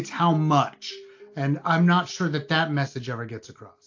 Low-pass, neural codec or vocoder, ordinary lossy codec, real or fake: 7.2 kHz; none; AAC, 48 kbps; real